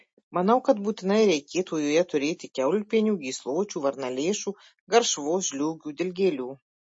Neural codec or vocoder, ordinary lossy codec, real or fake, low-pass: none; MP3, 32 kbps; real; 9.9 kHz